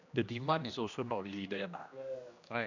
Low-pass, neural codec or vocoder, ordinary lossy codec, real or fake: 7.2 kHz; codec, 16 kHz, 1 kbps, X-Codec, HuBERT features, trained on general audio; none; fake